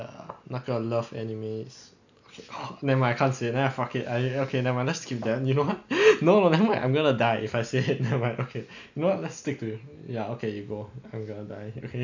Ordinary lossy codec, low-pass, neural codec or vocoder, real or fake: none; 7.2 kHz; none; real